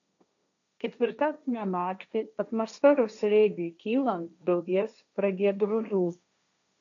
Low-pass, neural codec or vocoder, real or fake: 7.2 kHz; codec, 16 kHz, 1.1 kbps, Voila-Tokenizer; fake